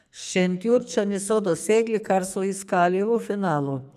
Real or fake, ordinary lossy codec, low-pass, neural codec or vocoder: fake; none; 14.4 kHz; codec, 44.1 kHz, 2.6 kbps, SNAC